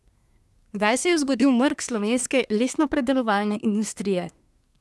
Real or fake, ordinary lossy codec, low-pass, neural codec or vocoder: fake; none; none; codec, 24 kHz, 1 kbps, SNAC